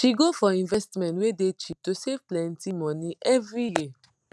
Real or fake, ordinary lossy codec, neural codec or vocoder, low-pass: real; none; none; none